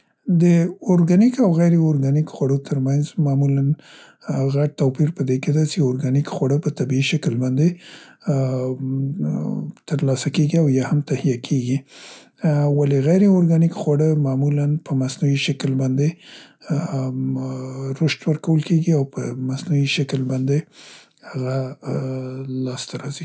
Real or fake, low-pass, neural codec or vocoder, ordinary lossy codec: real; none; none; none